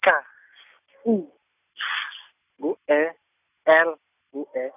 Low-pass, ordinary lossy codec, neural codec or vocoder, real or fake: 3.6 kHz; none; none; real